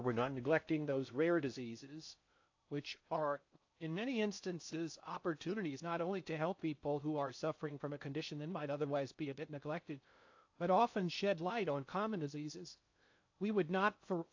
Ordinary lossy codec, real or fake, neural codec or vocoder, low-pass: AAC, 48 kbps; fake; codec, 16 kHz in and 24 kHz out, 0.6 kbps, FocalCodec, streaming, 4096 codes; 7.2 kHz